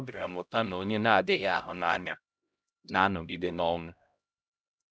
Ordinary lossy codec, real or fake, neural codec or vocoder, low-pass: none; fake; codec, 16 kHz, 0.5 kbps, X-Codec, HuBERT features, trained on LibriSpeech; none